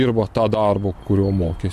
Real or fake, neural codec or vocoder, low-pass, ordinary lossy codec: real; none; 14.4 kHz; MP3, 64 kbps